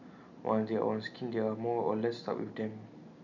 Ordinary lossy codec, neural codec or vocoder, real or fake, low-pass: none; none; real; 7.2 kHz